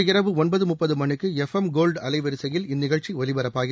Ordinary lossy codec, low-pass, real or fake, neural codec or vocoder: none; none; real; none